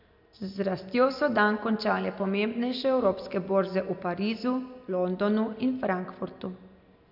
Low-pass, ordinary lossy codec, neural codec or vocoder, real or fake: 5.4 kHz; none; none; real